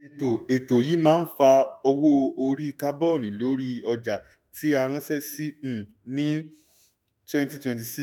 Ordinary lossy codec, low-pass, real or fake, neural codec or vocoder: none; none; fake; autoencoder, 48 kHz, 32 numbers a frame, DAC-VAE, trained on Japanese speech